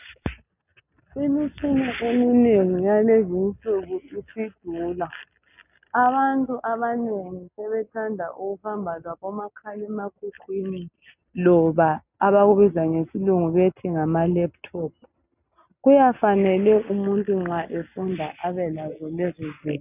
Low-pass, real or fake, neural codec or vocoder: 3.6 kHz; real; none